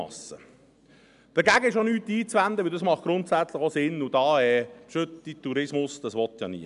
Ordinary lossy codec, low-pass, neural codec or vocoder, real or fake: none; 10.8 kHz; none; real